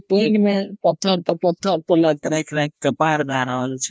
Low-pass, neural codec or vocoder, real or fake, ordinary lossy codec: none; codec, 16 kHz, 1 kbps, FreqCodec, larger model; fake; none